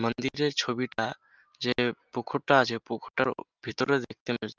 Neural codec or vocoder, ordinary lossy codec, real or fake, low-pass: none; Opus, 32 kbps; real; 7.2 kHz